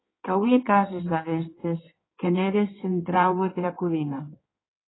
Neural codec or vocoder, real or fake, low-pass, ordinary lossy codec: codec, 16 kHz in and 24 kHz out, 1.1 kbps, FireRedTTS-2 codec; fake; 7.2 kHz; AAC, 16 kbps